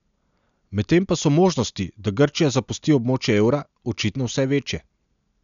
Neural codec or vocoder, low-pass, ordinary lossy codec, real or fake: none; 7.2 kHz; none; real